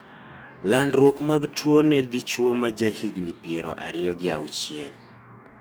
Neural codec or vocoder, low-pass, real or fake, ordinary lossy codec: codec, 44.1 kHz, 2.6 kbps, DAC; none; fake; none